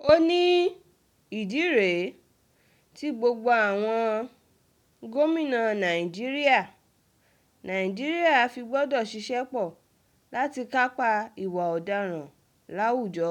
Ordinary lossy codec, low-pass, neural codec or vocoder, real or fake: none; 19.8 kHz; none; real